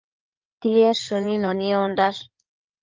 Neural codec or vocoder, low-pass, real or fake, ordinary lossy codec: codec, 16 kHz in and 24 kHz out, 2.2 kbps, FireRedTTS-2 codec; 7.2 kHz; fake; Opus, 24 kbps